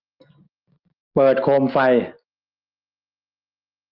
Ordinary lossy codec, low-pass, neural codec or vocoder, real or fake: Opus, 32 kbps; 5.4 kHz; none; real